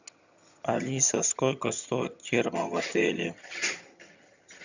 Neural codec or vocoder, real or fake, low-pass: vocoder, 22.05 kHz, 80 mel bands, HiFi-GAN; fake; 7.2 kHz